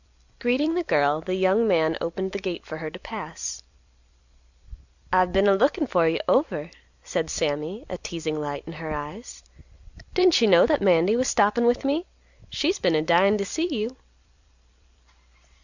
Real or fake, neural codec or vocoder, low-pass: fake; vocoder, 44.1 kHz, 128 mel bands every 256 samples, BigVGAN v2; 7.2 kHz